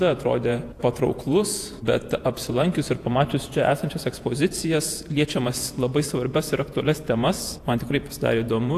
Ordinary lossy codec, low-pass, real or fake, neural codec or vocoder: AAC, 64 kbps; 14.4 kHz; fake; vocoder, 48 kHz, 128 mel bands, Vocos